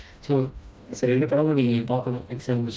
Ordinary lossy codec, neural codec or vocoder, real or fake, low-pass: none; codec, 16 kHz, 1 kbps, FreqCodec, smaller model; fake; none